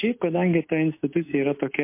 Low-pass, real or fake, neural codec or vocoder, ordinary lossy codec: 3.6 kHz; real; none; MP3, 24 kbps